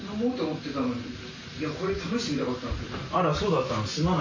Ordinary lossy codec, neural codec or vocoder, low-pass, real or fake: MP3, 48 kbps; none; 7.2 kHz; real